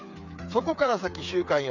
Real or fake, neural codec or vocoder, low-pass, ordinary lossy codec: fake; codec, 16 kHz, 8 kbps, FreqCodec, smaller model; 7.2 kHz; none